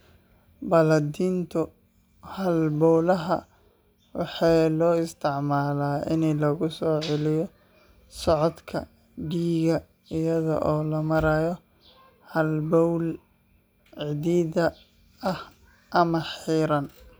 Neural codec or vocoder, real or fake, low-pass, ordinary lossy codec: none; real; none; none